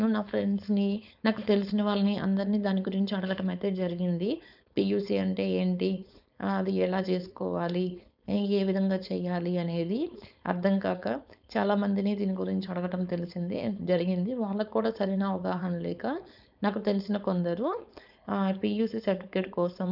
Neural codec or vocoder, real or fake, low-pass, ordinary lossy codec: codec, 16 kHz, 4.8 kbps, FACodec; fake; 5.4 kHz; none